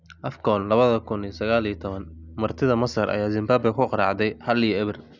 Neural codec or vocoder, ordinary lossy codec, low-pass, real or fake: none; none; 7.2 kHz; real